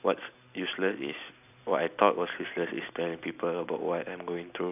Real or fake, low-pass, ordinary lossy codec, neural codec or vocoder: real; 3.6 kHz; none; none